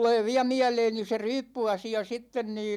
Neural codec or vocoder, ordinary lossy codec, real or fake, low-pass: vocoder, 44.1 kHz, 128 mel bands every 256 samples, BigVGAN v2; none; fake; 19.8 kHz